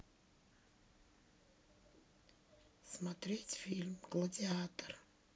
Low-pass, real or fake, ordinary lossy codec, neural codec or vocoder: none; real; none; none